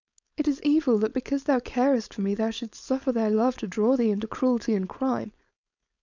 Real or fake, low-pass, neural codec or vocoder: fake; 7.2 kHz; codec, 16 kHz, 4.8 kbps, FACodec